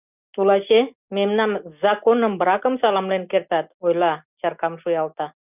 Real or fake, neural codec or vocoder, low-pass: real; none; 3.6 kHz